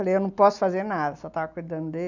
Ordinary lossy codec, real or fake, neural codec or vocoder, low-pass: none; real; none; 7.2 kHz